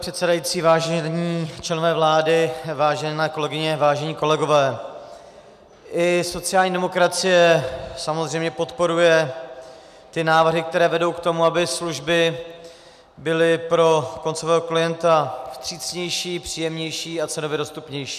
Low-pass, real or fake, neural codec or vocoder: 14.4 kHz; real; none